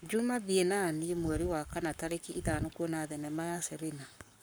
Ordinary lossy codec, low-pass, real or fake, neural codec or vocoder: none; none; fake; codec, 44.1 kHz, 7.8 kbps, Pupu-Codec